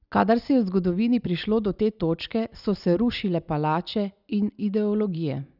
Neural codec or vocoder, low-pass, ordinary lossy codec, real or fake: none; 5.4 kHz; none; real